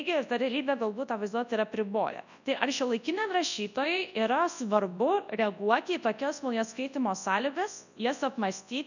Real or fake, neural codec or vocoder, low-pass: fake; codec, 24 kHz, 0.9 kbps, WavTokenizer, large speech release; 7.2 kHz